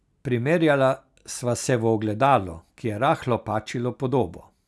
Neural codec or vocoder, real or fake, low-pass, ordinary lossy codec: none; real; none; none